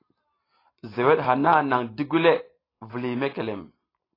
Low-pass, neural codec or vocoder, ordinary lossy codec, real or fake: 5.4 kHz; none; AAC, 24 kbps; real